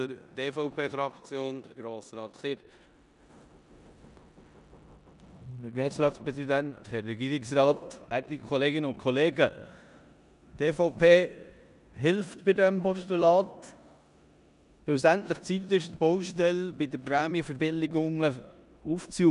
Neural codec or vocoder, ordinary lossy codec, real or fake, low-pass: codec, 16 kHz in and 24 kHz out, 0.9 kbps, LongCat-Audio-Codec, four codebook decoder; none; fake; 10.8 kHz